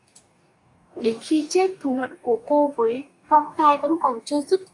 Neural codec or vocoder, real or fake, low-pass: codec, 44.1 kHz, 2.6 kbps, DAC; fake; 10.8 kHz